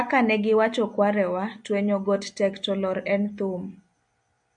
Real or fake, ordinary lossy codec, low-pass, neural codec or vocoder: real; MP3, 64 kbps; 9.9 kHz; none